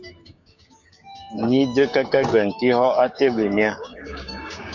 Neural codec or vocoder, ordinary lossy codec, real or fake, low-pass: codec, 16 kHz, 6 kbps, DAC; MP3, 64 kbps; fake; 7.2 kHz